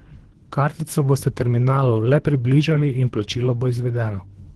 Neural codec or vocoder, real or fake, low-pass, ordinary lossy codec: codec, 24 kHz, 3 kbps, HILCodec; fake; 10.8 kHz; Opus, 16 kbps